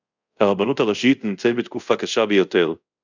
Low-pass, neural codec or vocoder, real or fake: 7.2 kHz; codec, 24 kHz, 0.5 kbps, DualCodec; fake